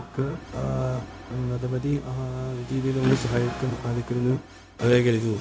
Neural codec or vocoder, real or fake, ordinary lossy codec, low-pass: codec, 16 kHz, 0.4 kbps, LongCat-Audio-Codec; fake; none; none